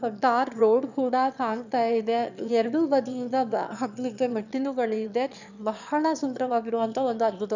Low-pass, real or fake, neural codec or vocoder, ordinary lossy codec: 7.2 kHz; fake; autoencoder, 22.05 kHz, a latent of 192 numbers a frame, VITS, trained on one speaker; none